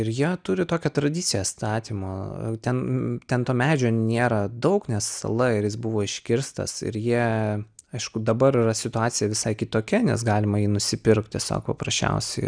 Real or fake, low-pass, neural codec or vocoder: real; 9.9 kHz; none